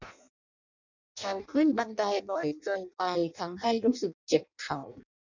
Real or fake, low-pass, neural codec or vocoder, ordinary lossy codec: fake; 7.2 kHz; codec, 16 kHz in and 24 kHz out, 0.6 kbps, FireRedTTS-2 codec; none